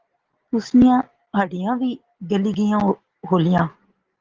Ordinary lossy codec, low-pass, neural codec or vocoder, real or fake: Opus, 16 kbps; 7.2 kHz; none; real